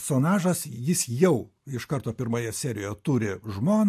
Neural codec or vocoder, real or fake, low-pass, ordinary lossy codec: none; real; 14.4 kHz; MP3, 64 kbps